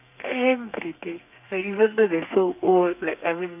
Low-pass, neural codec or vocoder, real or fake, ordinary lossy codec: 3.6 kHz; codec, 32 kHz, 1.9 kbps, SNAC; fake; none